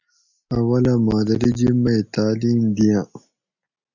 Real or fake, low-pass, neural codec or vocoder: real; 7.2 kHz; none